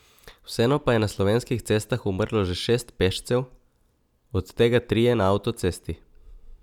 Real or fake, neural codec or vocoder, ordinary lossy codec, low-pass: real; none; none; 19.8 kHz